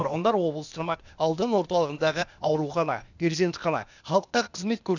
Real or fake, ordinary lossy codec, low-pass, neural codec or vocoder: fake; none; 7.2 kHz; codec, 16 kHz, 0.8 kbps, ZipCodec